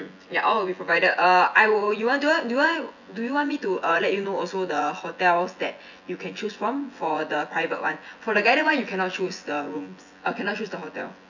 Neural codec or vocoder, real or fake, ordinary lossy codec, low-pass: vocoder, 24 kHz, 100 mel bands, Vocos; fake; none; 7.2 kHz